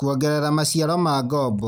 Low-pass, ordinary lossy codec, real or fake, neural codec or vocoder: none; none; real; none